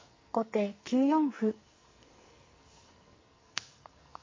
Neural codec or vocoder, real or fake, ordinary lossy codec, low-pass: codec, 44.1 kHz, 2.6 kbps, SNAC; fake; MP3, 32 kbps; 7.2 kHz